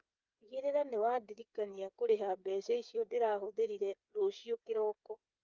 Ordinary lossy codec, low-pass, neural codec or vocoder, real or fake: Opus, 24 kbps; 7.2 kHz; codec, 16 kHz, 8 kbps, FreqCodec, smaller model; fake